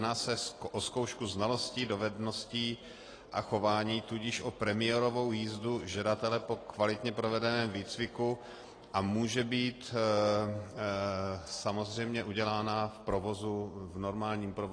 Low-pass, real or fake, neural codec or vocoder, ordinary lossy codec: 9.9 kHz; real; none; AAC, 32 kbps